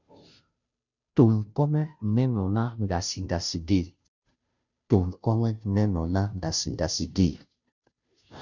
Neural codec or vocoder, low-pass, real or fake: codec, 16 kHz, 0.5 kbps, FunCodec, trained on Chinese and English, 25 frames a second; 7.2 kHz; fake